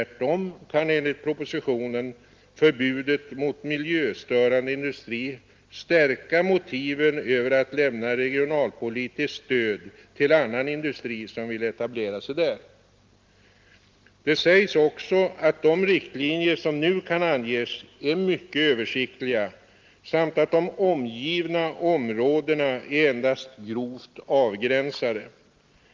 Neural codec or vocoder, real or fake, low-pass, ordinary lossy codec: none; real; 7.2 kHz; Opus, 32 kbps